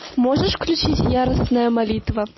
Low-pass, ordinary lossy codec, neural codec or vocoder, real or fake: 7.2 kHz; MP3, 24 kbps; none; real